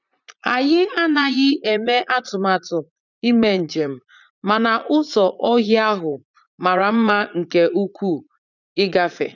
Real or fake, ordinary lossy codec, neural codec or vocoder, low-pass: fake; none; vocoder, 44.1 kHz, 80 mel bands, Vocos; 7.2 kHz